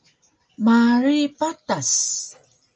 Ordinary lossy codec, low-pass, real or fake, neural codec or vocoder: Opus, 24 kbps; 7.2 kHz; real; none